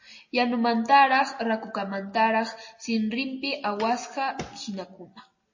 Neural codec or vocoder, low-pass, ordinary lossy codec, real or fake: none; 7.2 kHz; MP3, 32 kbps; real